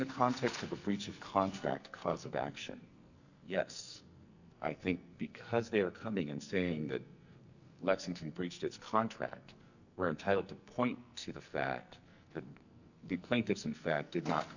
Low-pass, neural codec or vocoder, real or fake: 7.2 kHz; codec, 44.1 kHz, 2.6 kbps, SNAC; fake